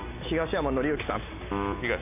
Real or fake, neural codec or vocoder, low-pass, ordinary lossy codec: real; none; 3.6 kHz; none